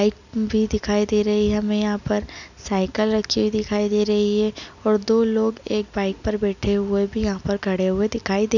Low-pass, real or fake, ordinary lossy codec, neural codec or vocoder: 7.2 kHz; real; none; none